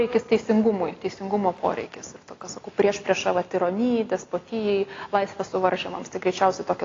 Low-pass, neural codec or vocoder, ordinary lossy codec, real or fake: 7.2 kHz; none; AAC, 32 kbps; real